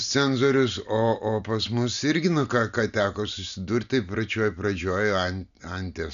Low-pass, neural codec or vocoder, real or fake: 7.2 kHz; none; real